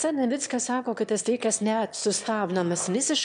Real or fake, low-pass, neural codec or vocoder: fake; 9.9 kHz; autoencoder, 22.05 kHz, a latent of 192 numbers a frame, VITS, trained on one speaker